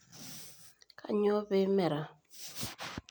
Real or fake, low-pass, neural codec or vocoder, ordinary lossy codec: real; none; none; none